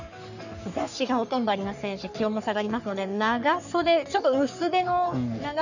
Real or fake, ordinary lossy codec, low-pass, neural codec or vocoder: fake; none; 7.2 kHz; codec, 44.1 kHz, 3.4 kbps, Pupu-Codec